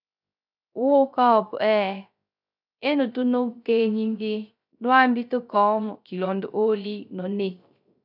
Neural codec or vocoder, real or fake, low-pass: codec, 16 kHz, 0.3 kbps, FocalCodec; fake; 5.4 kHz